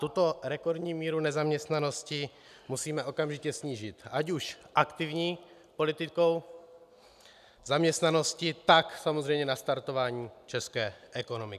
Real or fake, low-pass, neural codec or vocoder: real; 14.4 kHz; none